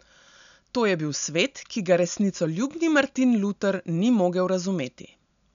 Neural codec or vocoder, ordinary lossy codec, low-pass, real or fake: none; none; 7.2 kHz; real